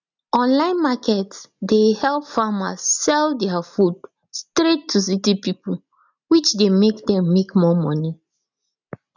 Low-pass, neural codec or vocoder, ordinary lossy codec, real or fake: 7.2 kHz; none; none; real